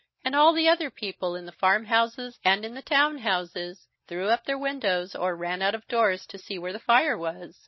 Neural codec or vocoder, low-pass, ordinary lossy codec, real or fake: none; 7.2 kHz; MP3, 24 kbps; real